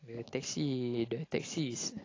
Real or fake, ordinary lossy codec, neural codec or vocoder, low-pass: real; AAC, 32 kbps; none; 7.2 kHz